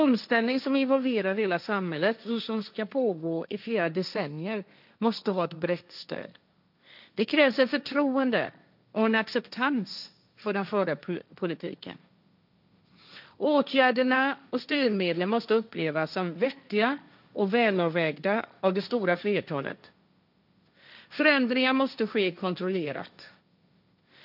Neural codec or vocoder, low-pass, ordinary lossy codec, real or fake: codec, 16 kHz, 1.1 kbps, Voila-Tokenizer; 5.4 kHz; none; fake